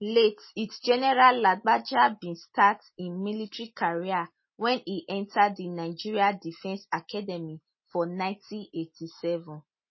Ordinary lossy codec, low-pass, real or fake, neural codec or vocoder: MP3, 24 kbps; 7.2 kHz; real; none